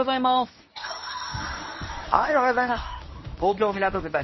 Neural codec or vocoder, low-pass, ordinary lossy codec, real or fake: codec, 24 kHz, 0.9 kbps, WavTokenizer, medium speech release version 1; 7.2 kHz; MP3, 24 kbps; fake